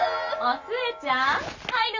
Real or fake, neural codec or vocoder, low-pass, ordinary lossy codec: real; none; 7.2 kHz; none